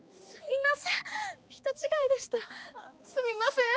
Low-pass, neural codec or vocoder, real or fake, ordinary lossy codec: none; codec, 16 kHz, 2 kbps, X-Codec, HuBERT features, trained on balanced general audio; fake; none